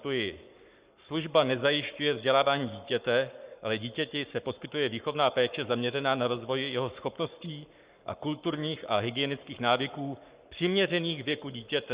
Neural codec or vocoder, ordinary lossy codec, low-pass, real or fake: codec, 44.1 kHz, 7.8 kbps, Pupu-Codec; Opus, 64 kbps; 3.6 kHz; fake